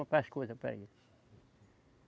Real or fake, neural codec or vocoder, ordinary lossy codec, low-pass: real; none; none; none